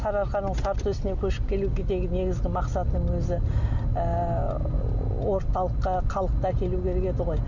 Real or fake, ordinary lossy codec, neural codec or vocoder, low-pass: real; none; none; 7.2 kHz